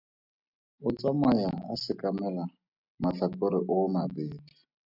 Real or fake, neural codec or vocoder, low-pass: real; none; 5.4 kHz